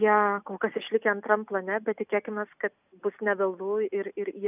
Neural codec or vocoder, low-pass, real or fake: none; 3.6 kHz; real